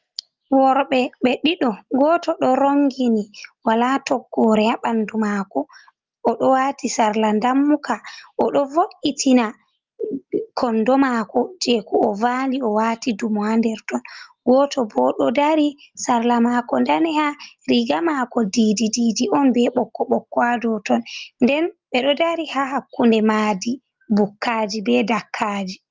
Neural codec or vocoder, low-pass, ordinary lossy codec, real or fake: none; 7.2 kHz; Opus, 32 kbps; real